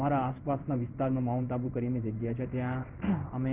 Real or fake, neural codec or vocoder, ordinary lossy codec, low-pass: fake; codec, 16 kHz in and 24 kHz out, 1 kbps, XY-Tokenizer; Opus, 32 kbps; 3.6 kHz